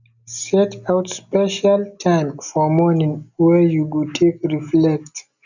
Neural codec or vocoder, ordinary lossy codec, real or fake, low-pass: none; none; real; 7.2 kHz